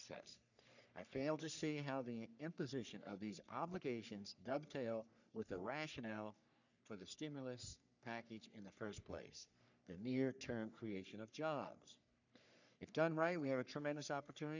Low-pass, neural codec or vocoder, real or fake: 7.2 kHz; codec, 44.1 kHz, 3.4 kbps, Pupu-Codec; fake